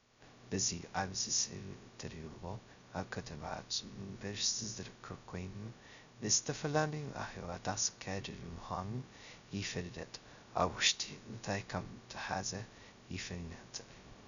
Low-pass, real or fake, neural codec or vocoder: 7.2 kHz; fake; codec, 16 kHz, 0.2 kbps, FocalCodec